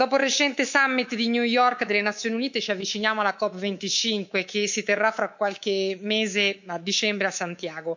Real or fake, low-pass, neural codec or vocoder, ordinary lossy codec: fake; 7.2 kHz; codec, 24 kHz, 3.1 kbps, DualCodec; none